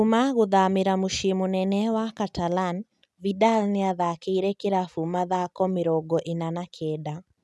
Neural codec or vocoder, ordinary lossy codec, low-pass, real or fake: none; none; none; real